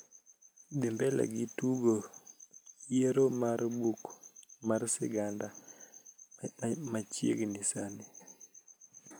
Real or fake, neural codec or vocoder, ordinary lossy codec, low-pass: real; none; none; none